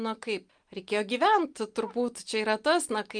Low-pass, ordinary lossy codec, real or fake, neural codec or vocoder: 9.9 kHz; Opus, 32 kbps; real; none